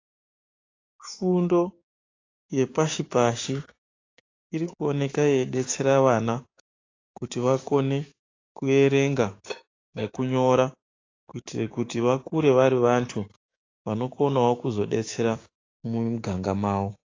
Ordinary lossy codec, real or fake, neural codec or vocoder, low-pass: AAC, 32 kbps; fake; codec, 16 kHz, 6 kbps, DAC; 7.2 kHz